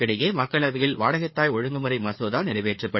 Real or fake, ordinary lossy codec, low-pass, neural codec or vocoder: fake; MP3, 24 kbps; 7.2 kHz; codec, 16 kHz, 4 kbps, FreqCodec, larger model